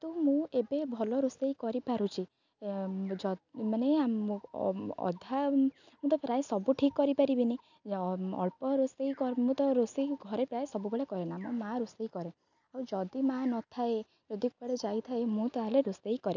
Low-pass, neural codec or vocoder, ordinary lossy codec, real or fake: 7.2 kHz; none; none; real